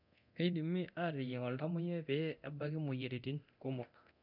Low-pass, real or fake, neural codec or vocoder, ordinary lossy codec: 5.4 kHz; fake; codec, 24 kHz, 0.9 kbps, DualCodec; none